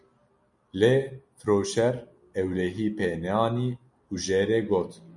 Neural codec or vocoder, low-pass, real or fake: none; 10.8 kHz; real